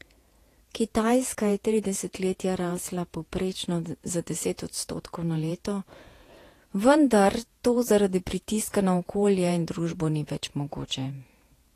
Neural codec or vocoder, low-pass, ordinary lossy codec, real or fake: vocoder, 48 kHz, 128 mel bands, Vocos; 14.4 kHz; AAC, 48 kbps; fake